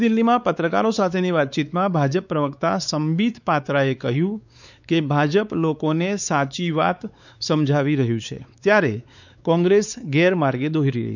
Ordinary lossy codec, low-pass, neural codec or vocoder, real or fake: none; 7.2 kHz; codec, 16 kHz, 4 kbps, X-Codec, WavLM features, trained on Multilingual LibriSpeech; fake